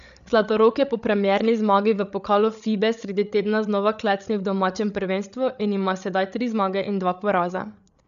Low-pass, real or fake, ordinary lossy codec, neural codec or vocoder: 7.2 kHz; fake; none; codec, 16 kHz, 8 kbps, FreqCodec, larger model